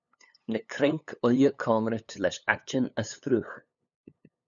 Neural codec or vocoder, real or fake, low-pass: codec, 16 kHz, 8 kbps, FunCodec, trained on LibriTTS, 25 frames a second; fake; 7.2 kHz